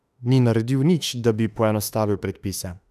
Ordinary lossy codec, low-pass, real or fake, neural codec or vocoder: none; 14.4 kHz; fake; autoencoder, 48 kHz, 32 numbers a frame, DAC-VAE, trained on Japanese speech